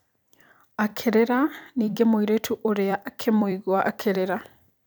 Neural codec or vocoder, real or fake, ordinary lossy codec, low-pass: vocoder, 44.1 kHz, 128 mel bands every 256 samples, BigVGAN v2; fake; none; none